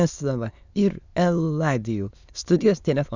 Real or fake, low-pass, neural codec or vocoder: fake; 7.2 kHz; autoencoder, 22.05 kHz, a latent of 192 numbers a frame, VITS, trained on many speakers